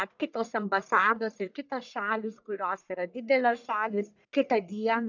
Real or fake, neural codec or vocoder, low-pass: fake; codec, 44.1 kHz, 1.7 kbps, Pupu-Codec; 7.2 kHz